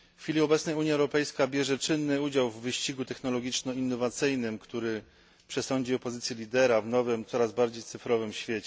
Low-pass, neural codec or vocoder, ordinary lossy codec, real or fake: none; none; none; real